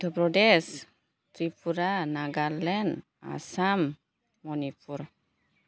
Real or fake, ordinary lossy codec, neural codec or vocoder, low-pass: real; none; none; none